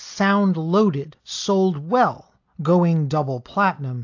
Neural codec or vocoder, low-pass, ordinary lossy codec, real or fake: none; 7.2 kHz; AAC, 48 kbps; real